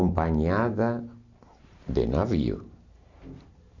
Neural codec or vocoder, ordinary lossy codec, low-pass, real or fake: none; none; 7.2 kHz; real